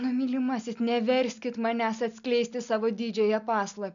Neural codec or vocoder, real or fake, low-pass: none; real; 7.2 kHz